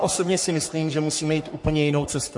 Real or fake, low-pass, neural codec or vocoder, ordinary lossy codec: fake; 10.8 kHz; codec, 44.1 kHz, 3.4 kbps, Pupu-Codec; MP3, 48 kbps